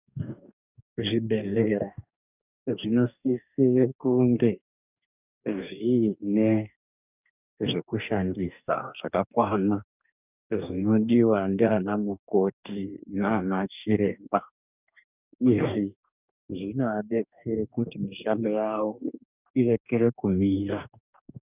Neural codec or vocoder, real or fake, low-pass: codec, 44.1 kHz, 2.6 kbps, DAC; fake; 3.6 kHz